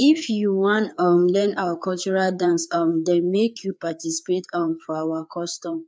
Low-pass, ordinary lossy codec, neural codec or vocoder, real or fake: none; none; codec, 16 kHz, 4 kbps, FreqCodec, larger model; fake